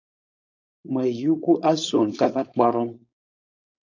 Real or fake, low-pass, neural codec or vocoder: fake; 7.2 kHz; codec, 16 kHz, 4.8 kbps, FACodec